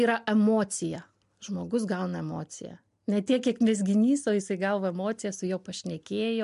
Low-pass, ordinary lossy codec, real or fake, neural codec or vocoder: 10.8 kHz; MP3, 64 kbps; real; none